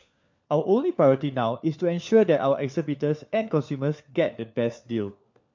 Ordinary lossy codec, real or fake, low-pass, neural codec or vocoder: MP3, 48 kbps; fake; 7.2 kHz; codec, 16 kHz, 4 kbps, FunCodec, trained on LibriTTS, 50 frames a second